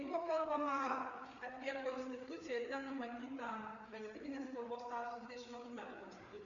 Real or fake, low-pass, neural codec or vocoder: fake; 7.2 kHz; codec, 16 kHz, 4 kbps, FreqCodec, larger model